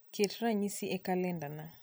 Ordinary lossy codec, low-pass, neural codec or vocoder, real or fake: none; none; none; real